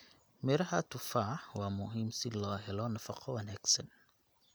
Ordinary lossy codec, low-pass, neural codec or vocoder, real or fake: none; none; none; real